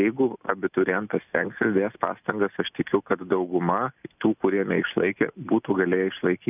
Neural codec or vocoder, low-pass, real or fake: none; 3.6 kHz; real